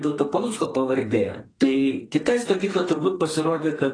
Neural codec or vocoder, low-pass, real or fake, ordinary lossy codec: codec, 32 kHz, 1.9 kbps, SNAC; 9.9 kHz; fake; AAC, 32 kbps